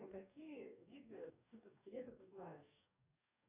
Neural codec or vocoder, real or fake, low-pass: codec, 44.1 kHz, 2.6 kbps, DAC; fake; 3.6 kHz